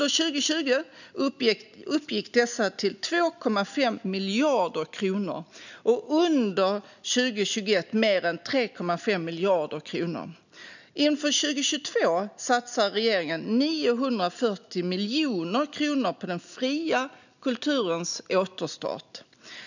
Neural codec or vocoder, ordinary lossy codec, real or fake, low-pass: none; none; real; 7.2 kHz